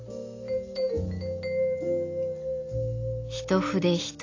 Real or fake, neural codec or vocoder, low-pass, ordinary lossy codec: real; none; 7.2 kHz; AAC, 32 kbps